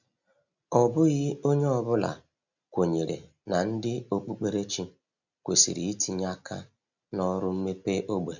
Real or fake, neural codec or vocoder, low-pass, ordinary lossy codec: real; none; 7.2 kHz; none